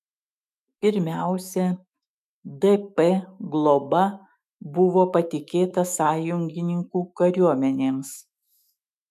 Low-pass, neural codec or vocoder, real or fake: 14.4 kHz; autoencoder, 48 kHz, 128 numbers a frame, DAC-VAE, trained on Japanese speech; fake